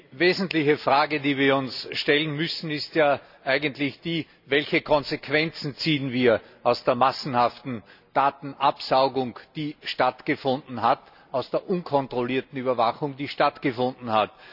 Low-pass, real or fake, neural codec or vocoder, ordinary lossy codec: 5.4 kHz; real; none; none